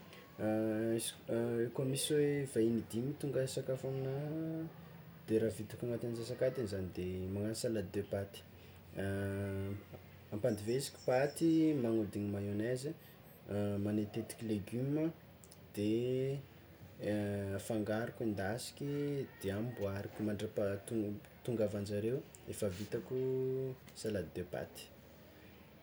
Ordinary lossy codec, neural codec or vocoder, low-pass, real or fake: none; none; none; real